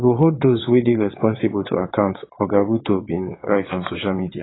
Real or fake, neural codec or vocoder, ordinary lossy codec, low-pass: fake; vocoder, 22.05 kHz, 80 mel bands, WaveNeXt; AAC, 16 kbps; 7.2 kHz